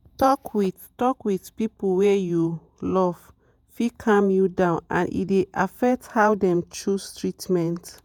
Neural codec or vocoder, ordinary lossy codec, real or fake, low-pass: vocoder, 48 kHz, 128 mel bands, Vocos; none; fake; 19.8 kHz